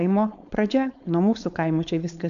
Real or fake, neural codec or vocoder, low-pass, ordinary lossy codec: fake; codec, 16 kHz, 4.8 kbps, FACodec; 7.2 kHz; MP3, 64 kbps